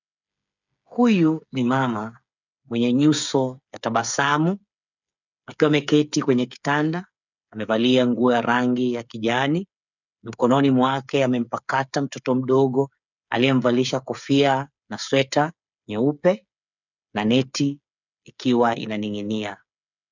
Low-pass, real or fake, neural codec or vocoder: 7.2 kHz; fake; codec, 16 kHz, 8 kbps, FreqCodec, smaller model